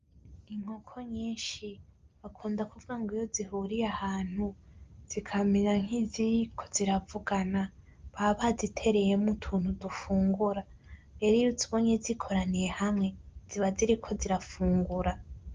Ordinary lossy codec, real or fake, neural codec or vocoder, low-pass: Opus, 32 kbps; real; none; 7.2 kHz